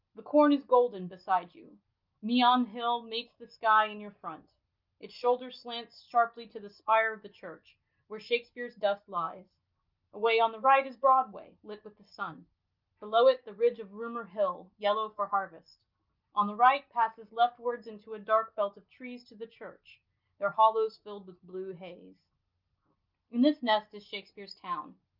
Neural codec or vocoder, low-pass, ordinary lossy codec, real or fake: none; 5.4 kHz; Opus, 32 kbps; real